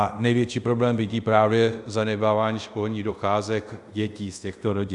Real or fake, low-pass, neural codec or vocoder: fake; 10.8 kHz; codec, 24 kHz, 0.5 kbps, DualCodec